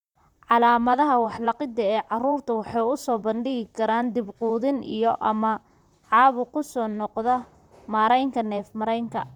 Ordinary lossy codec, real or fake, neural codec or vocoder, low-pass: none; fake; vocoder, 44.1 kHz, 128 mel bands, Pupu-Vocoder; 19.8 kHz